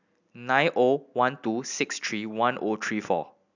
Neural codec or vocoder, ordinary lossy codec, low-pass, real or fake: none; none; 7.2 kHz; real